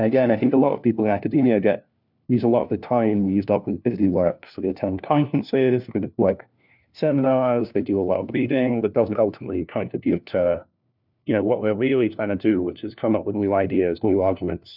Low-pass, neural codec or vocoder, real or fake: 5.4 kHz; codec, 16 kHz, 1 kbps, FunCodec, trained on LibriTTS, 50 frames a second; fake